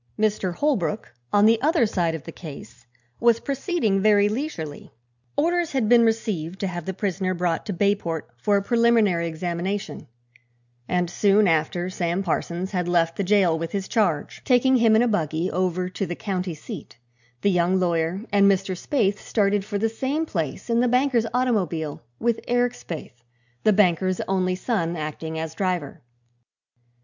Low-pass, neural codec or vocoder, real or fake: 7.2 kHz; none; real